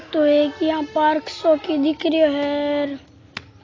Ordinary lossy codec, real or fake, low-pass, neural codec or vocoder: AAC, 32 kbps; real; 7.2 kHz; none